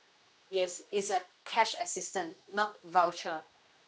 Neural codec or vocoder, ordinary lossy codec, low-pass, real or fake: codec, 16 kHz, 1 kbps, X-Codec, HuBERT features, trained on general audio; none; none; fake